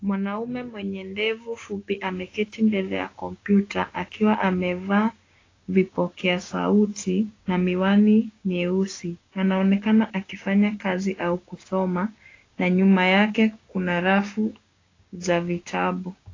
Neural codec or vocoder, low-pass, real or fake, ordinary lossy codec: codec, 16 kHz, 6 kbps, DAC; 7.2 kHz; fake; AAC, 32 kbps